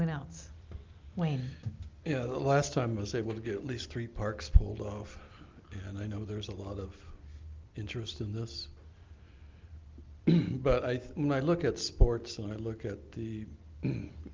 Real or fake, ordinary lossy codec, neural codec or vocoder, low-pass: real; Opus, 24 kbps; none; 7.2 kHz